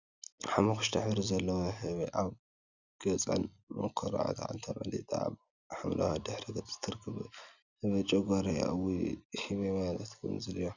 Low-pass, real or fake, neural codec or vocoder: 7.2 kHz; real; none